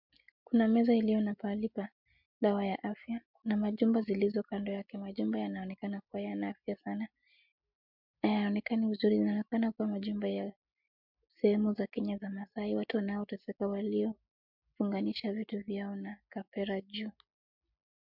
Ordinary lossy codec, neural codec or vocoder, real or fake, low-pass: MP3, 48 kbps; none; real; 5.4 kHz